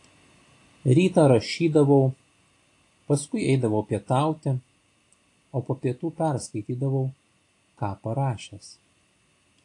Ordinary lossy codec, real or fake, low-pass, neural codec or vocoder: AAC, 48 kbps; real; 10.8 kHz; none